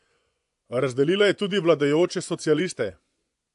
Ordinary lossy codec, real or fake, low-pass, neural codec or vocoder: AAC, 64 kbps; fake; 10.8 kHz; vocoder, 24 kHz, 100 mel bands, Vocos